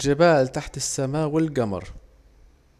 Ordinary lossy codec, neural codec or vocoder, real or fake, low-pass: none; none; real; 14.4 kHz